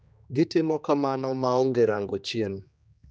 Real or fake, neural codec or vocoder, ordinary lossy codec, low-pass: fake; codec, 16 kHz, 2 kbps, X-Codec, HuBERT features, trained on general audio; none; none